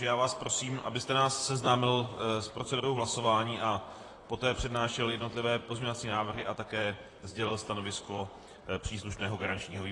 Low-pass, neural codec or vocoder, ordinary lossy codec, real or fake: 10.8 kHz; vocoder, 44.1 kHz, 128 mel bands, Pupu-Vocoder; AAC, 32 kbps; fake